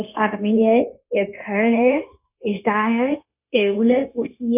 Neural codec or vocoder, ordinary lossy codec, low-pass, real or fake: codec, 16 kHz, 0.9 kbps, LongCat-Audio-Codec; none; 3.6 kHz; fake